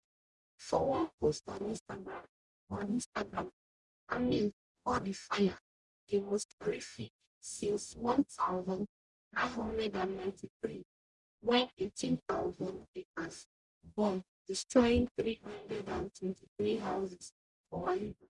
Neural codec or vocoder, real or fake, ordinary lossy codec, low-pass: codec, 44.1 kHz, 0.9 kbps, DAC; fake; none; 10.8 kHz